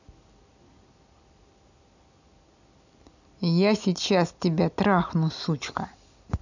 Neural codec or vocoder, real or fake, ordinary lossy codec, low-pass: none; real; none; 7.2 kHz